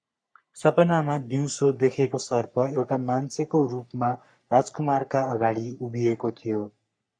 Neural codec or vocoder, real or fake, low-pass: codec, 44.1 kHz, 3.4 kbps, Pupu-Codec; fake; 9.9 kHz